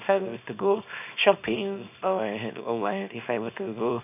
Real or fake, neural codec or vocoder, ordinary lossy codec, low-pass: fake; codec, 24 kHz, 0.9 kbps, WavTokenizer, small release; none; 3.6 kHz